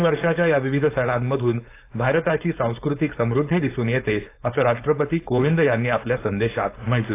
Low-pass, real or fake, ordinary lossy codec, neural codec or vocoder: 3.6 kHz; fake; AAC, 24 kbps; codec, 16 kHz, 4.8 kbps, FACodec